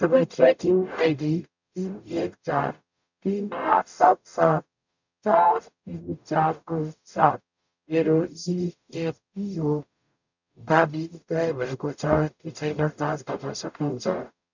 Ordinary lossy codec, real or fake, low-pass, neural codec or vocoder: none; fake; 7.2 kHz; codec, 44.1 kHz, 0.9 kbps, DAC